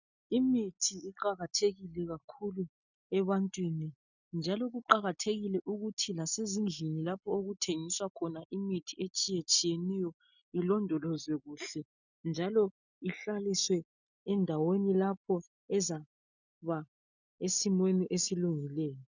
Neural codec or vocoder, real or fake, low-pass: none; real; 7.2 kHz